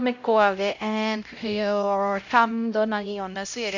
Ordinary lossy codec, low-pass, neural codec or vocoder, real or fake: none; 7.2 kHz; codec, 16 kHz, 0.5 kbps, X-Codec, HuBERT features, trained on LibriSpeech; fake